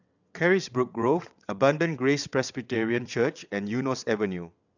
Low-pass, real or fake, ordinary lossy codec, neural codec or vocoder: 7.2 kHz; fake; none; vocoder, 22.05 kHz, 80 mel bands, WaveNeXt